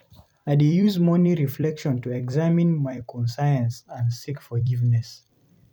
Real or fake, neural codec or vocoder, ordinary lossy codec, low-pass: fake; vocoder, 44.1 kHz, 128 mel bands every 256 samples, BigVGAN v2; none; 19.8 kHz